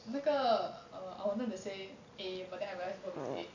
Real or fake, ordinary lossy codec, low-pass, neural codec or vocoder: real; AAC, 48 kbps; 7.2 kHz; none